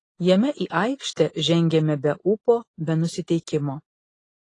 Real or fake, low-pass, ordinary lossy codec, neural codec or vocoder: real; 10.8 kHz; AAC, 32 kbps; none